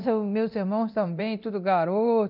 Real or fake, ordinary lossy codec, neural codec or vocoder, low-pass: fake; none; codec, 24 kHz, 0.9 kbps, DualCodec; 5.4 kHz